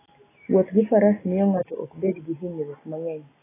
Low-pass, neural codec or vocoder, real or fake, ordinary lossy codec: 3.6 kHz; none; real; AAC, 16 kbps